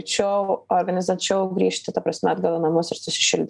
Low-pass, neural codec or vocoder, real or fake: 10.8 kHz; none; real